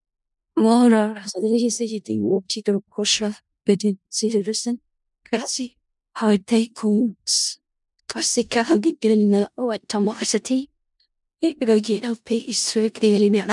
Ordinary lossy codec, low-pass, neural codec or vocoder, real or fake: MP3, 96 kbps; 10.8 kHz; codec, 16 kHz in and 24 kHz out, 0.4 kbps, LongCat-Audio-Codec, four codebook decoder; fake